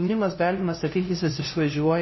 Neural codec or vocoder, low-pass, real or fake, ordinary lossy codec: codec, 16 kHz, 0.5 kbps, FunCodec, trained on LibriTTS, 25 frames a second; 7.2 kHz; fake; MP3, 24 kbps